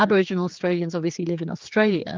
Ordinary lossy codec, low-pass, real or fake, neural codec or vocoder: Opus, 32 kbps; 7.2 kHz; fake; codec, 16 kHz, 4 kbps, X-Codec, HuBERT features, trained on general audio